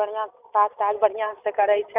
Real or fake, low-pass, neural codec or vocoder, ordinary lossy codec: real; 3.6 kHz; none; none